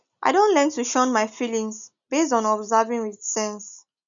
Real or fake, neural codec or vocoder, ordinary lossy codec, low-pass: real; none; none; 7.2 kHz